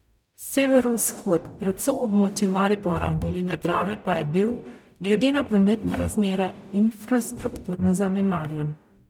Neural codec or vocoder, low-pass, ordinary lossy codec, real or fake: codec, 44.1 kHz, 0.9 kbps, DAC; 19.8 kHz; none; fake